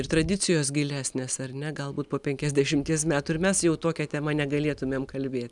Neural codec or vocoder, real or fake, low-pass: none; real; 10.8 kHz